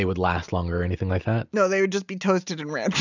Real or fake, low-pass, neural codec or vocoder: real; 7.2 kHz; none